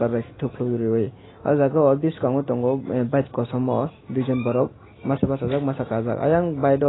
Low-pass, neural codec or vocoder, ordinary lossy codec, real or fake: 7.2 kHz; none; AAC, 16 kbps; real